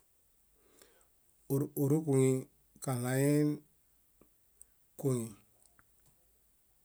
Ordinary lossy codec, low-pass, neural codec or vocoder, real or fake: none; none; none; real